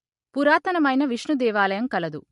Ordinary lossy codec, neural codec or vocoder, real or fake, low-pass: MP3, 48 kbps; none; real; 14.4 kHz